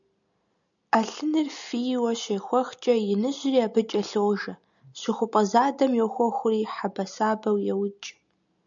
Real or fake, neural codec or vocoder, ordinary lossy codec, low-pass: real; none; MP3, 96 kbps; 7.2 kHz